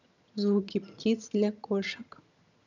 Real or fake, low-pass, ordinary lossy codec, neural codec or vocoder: fake; 7.2 kHz; none; vocoder, 22.05 kHz, 80 mel bands, HiFi-GAN